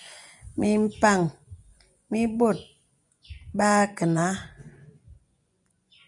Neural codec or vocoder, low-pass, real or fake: vocoder, 24 kHz, 100 mel bands, Vocos; 10.8 kHz; fake